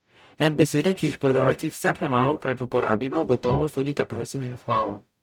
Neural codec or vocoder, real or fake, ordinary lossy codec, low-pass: codec, 44.1 kHz, 0.9 kbps, DAC; fake; none; 19.8 kHz